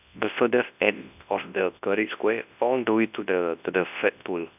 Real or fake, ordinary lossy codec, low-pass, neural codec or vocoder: fake; none; 3.6 kHz; codec, 24 kHz, 0.9 kbps, WavTokenizer, large speech release